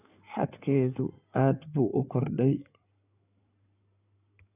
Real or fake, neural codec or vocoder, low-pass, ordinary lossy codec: fake; codec, 16 kHz in and 24 kHz out, 2.2 kbps, FireRedTTS-2 codec; 3.6 kHz; none